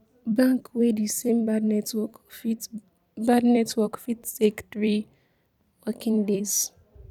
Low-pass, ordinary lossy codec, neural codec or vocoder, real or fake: 19.8 kHz; none; vocoder, 44.1 kHz, 128 mel bands every 256 samples, BigVGAN v2; fake